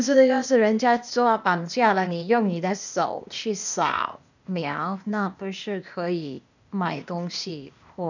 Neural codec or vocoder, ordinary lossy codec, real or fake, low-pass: codec, 16 kHz, 0.8 kbps, ZipCodec; none; fake; 7.2 kHz